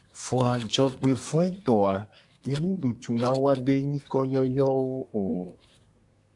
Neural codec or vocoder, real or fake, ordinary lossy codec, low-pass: codec, 24 kHz, 1 kbps, SNAC; fake; AAC, 64 kbps; 10.8 kHz